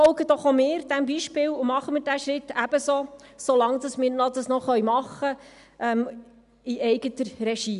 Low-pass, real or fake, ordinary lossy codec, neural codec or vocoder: 10.8 kHz; real; none; none